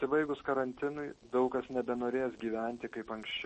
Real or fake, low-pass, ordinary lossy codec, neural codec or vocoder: real; 10.8 kHz; MP3, 32 kbps; none